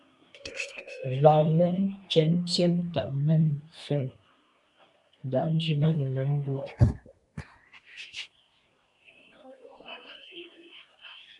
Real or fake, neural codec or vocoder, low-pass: fake; codec, 24 kHz, 1 kbps, SNAC; 10.8 kHz